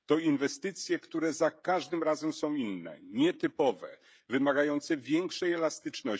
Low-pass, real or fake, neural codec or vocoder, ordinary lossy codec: none; fake; codec, 16 kHz, 16 kbps, FreqCodec, smaller model; none